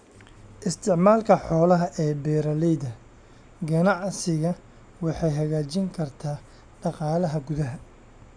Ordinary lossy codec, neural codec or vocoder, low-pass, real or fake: none; none; 9.9 kHz; real